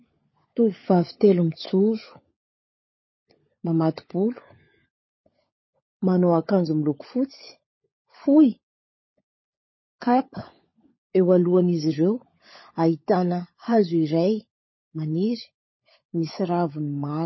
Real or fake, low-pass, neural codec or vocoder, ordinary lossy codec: fake; 7.2 kHz; codec, 16 kHz, 16 kbps, FunCodec, trained on LibriTTS, 50 frames a second; MP3, 24 kbps